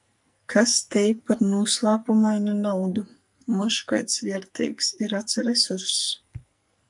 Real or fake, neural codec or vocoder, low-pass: fake; codec, 44.1 kHz, 2.6 kbps, SNAC; 10.8 kHz